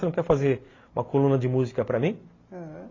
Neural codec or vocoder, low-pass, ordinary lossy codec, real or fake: none; 7.2 kHz; AAC, 32 kbps; real